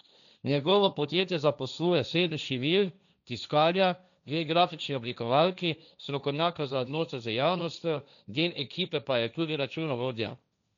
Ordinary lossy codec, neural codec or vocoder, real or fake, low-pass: none; codec, 16 kHz, 1.1 kbps, Voila-Tokenizer; fake; 7.2 kHz